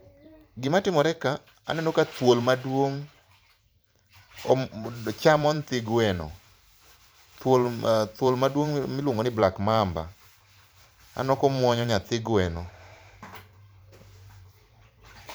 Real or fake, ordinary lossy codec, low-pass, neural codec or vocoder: fake; none; none; vocoder, 44.1 kHz, 128 mel bands every 512 samples, BigVGAN v2